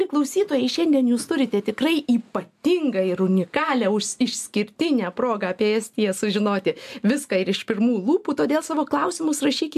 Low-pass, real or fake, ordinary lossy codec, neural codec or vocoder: 14.4 kHz; real; AAC, 96 kbps; none